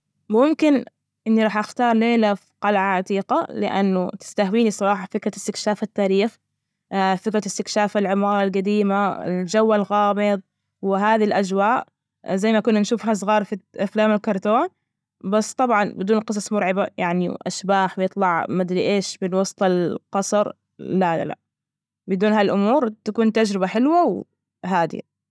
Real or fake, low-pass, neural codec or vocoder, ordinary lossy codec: real; none; none; none